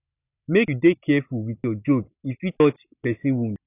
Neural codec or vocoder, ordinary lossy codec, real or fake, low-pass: none; none; real; 3.6 kHz